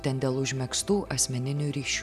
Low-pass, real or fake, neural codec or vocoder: 14.4 kHz; real; none